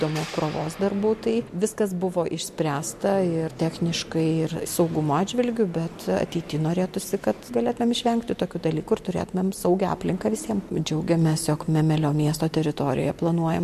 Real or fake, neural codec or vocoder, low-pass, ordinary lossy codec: real; none; 14.4 kHz; MP3, 64 kbps